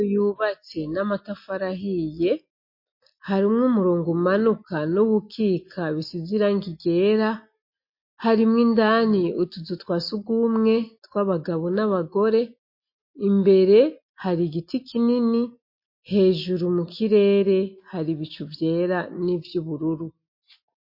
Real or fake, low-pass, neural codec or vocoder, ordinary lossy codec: real; 5.4 kHz; none; MP3, 32 kbps